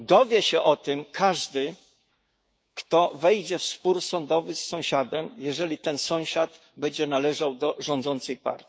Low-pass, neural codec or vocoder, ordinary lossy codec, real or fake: none; codec, 16 kHz, 6 kbps, DAC; none; fake